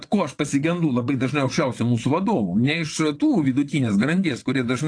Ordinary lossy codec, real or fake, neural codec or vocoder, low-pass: AAC, 48 kbps; fake; vocoder, 22.05 kHz, 80 mel bands, WaveNeXt; 9.9 kHz